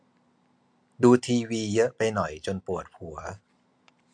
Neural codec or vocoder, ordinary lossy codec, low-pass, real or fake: vocoder, 44.1 kHz, 128 mel bands every 256 samples, BigVGAN v2; MP3, 64 kbps; 9.9 kHz; fake